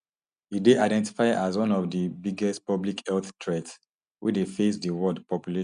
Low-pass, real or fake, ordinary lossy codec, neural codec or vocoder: 9.9 kHz; real; none; none